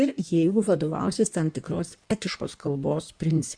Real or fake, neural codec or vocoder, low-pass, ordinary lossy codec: fake; codec, 16 kHz in and 24 kHz out, 1.1 kbps, FireRedTTS-2 codec; 9.9 kHz; Opus, 64 kbps